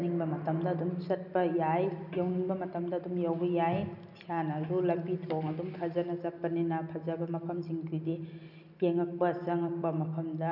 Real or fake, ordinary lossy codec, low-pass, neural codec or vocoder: real; none; 5.4 kHz; none